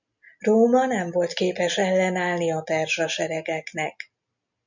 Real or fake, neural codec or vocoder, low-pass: real; none; 7.2 kHz